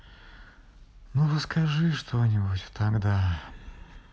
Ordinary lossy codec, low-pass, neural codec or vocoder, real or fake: none; none; none; real